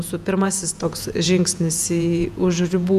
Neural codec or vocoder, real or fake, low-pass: vocoder, 48 kHz, 128 mel bands, Vocos; fake; 14.4 kHz